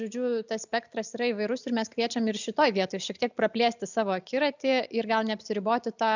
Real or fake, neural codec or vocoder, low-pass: real; none; 7.2 kHz